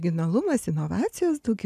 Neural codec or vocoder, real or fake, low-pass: none; real; 14.4 kHz